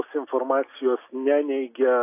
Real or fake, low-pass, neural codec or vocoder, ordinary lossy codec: real; 3.6 kHz; none; AAC, 24 kbps